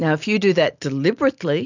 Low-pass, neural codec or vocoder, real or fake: 7.2 kHz; none; real